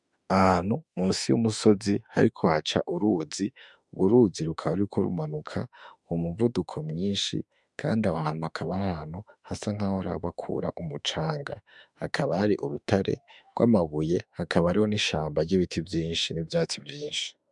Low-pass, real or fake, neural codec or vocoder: 10.8 kHz; fake; autoencoder, 48 kHz, 32 numbers a frame, DAC-VAE, trained on Japanese speech